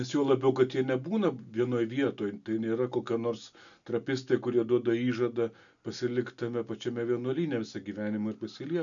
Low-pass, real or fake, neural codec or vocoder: 7.2 kHz; real; none